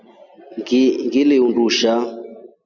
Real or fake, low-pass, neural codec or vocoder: real; 7.2 kHz; none